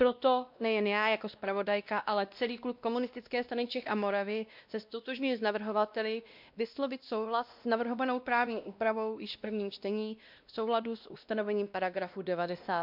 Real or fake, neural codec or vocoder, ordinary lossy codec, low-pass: fake; codec, 16 kHz, 1 kbps, X-Codec, WavLM features, trained on Multilingual LibriSpeech; MP3, 48 kbps; 5.4 kHz